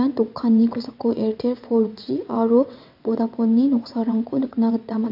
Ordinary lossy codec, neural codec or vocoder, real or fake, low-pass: MP3, 48 kbps; vocoder, 22.05 kHz, 80 mel bands, WaveNeXt; fake; 5.4 kHz